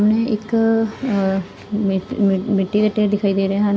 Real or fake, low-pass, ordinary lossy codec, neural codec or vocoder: real; none; none; none